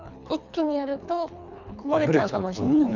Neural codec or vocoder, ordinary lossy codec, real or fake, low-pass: codec, 24 kHz, 3 kbps, HILCodec; none; fake; 7.2 kHz